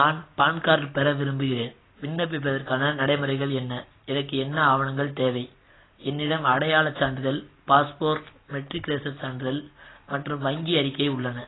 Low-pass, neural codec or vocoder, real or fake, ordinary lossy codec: 7.2 kHz; none; real; AAC, 16 kbps